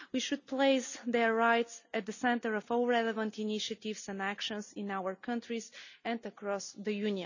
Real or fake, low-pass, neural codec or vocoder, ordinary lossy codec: real; 7.2 kHz; none; none